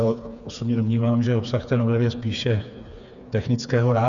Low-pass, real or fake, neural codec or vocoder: 7.2 kHz; fake; codec, 16 kHz, 4 kbps, FreqCodec, smaller model